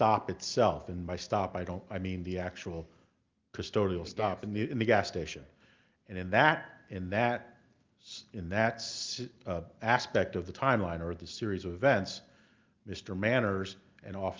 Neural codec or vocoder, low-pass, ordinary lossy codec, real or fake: none; 7.2 kHz; Opus, 32 kbps; real